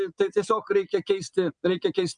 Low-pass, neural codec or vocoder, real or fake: 9.9 kHz; none; real